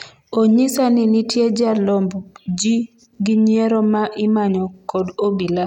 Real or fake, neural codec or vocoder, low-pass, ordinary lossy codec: real; none; 19.8 kHz; none